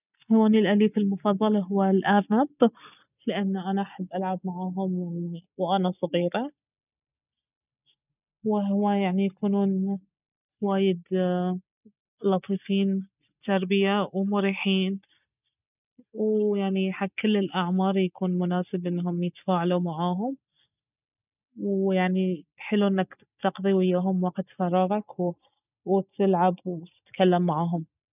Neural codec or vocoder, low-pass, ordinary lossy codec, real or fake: none; 3.6 kHz; none; real